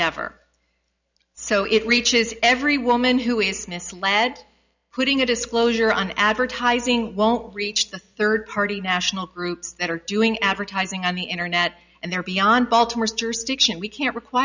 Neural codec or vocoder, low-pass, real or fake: none; 7.2 kHz; real